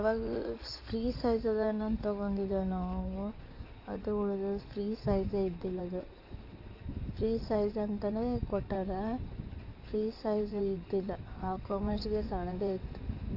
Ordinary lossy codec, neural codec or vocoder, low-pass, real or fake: AAC, 48 kbps; codec, 16 kHz in and 24 kHz out, 2.2 kbps, FireRedTTS-2 codec; 5.4 kHz; fake